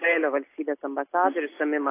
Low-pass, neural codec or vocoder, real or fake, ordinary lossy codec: 3.6 kHz; none; real; AAC, 24 kbps